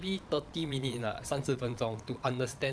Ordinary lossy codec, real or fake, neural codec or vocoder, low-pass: none; fake; vocoder, 22.05 kHz, 80 mel bands, Vocos; none